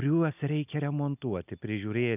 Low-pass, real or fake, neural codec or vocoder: 3.6 kHz; real; none